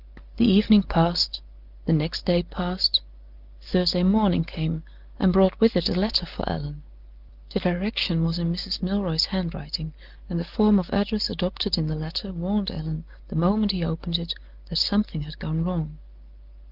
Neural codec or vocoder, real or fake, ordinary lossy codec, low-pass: none; real; Opus, 24 kbps; 5.4 kHz